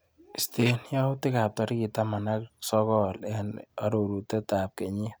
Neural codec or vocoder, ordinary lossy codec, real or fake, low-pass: none; none; real; none